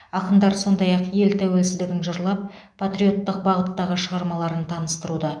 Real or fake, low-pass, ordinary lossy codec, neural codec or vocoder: fake; 9.9 kHz; none; autoencoder, 48 kHz, 128 numbers a frame, DAC-VAE, trained on Japanese speech